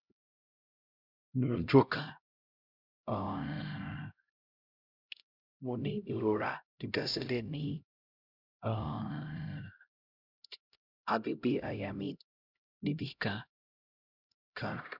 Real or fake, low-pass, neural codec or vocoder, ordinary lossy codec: fake; 5.4 kHz; codec, 16 kHz, 0.5 kbps, X-Codec, HuBERT features, trained on LibriSpeech; none